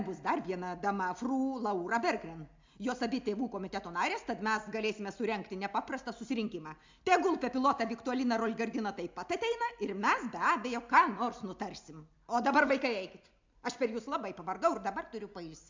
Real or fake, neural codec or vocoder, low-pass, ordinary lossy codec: real; none; 7.2 kHz; MP3, 64 kbps